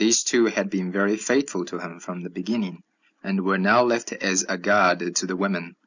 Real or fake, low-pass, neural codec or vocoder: real; 7.2 kHz; none